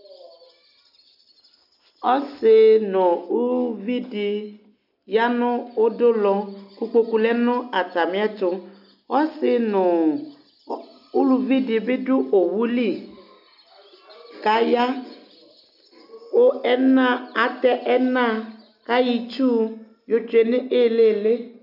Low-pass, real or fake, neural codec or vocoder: 5.4 kHz; real; none